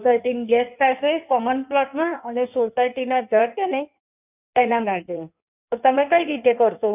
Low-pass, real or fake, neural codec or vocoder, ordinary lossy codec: 3.6 kHz; fake; codec, 16 kHz in and 24 kHz out, 1.1 kbps, FireRedTTS-2 codec; none